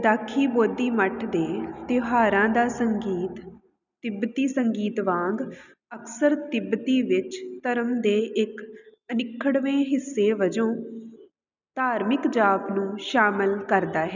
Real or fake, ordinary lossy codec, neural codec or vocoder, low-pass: real; none; none; 7.2 kHz